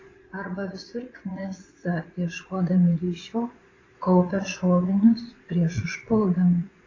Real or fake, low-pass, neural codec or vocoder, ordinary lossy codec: fake; 7.2 kHz; vocoder, 22.05 kHz, 80 mel bands, Vocos; AAC, 32 kbps